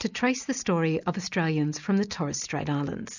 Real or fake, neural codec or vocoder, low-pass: real; none; 7.2 kHz